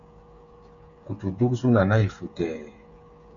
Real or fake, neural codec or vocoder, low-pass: fake; codec, 16 kHz, 8 kbps, FreqCodec, smaller model; 7.2 kHz